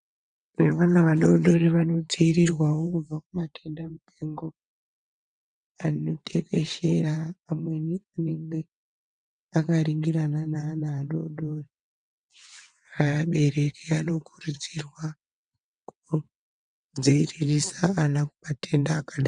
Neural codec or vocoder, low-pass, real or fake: vocoder, 22.05 kHz, 80 mel bands, WaveNeXt; 9.9 kHz; fake